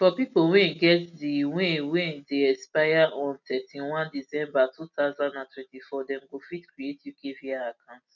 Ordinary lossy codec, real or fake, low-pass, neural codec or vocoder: none; real; 7.2 kHz; none